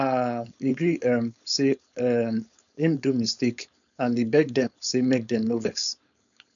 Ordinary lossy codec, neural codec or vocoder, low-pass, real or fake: none; codec, 16 kHz, 4.8 kbps, FACodec; 7.2 kHz; fake